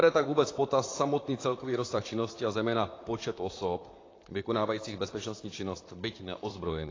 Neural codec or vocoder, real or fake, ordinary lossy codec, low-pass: vocoder, 44.1 kHz, 128 mel bands, Pupu-Vocoder; fake; AAC, 32 kbps; 7.2 kHz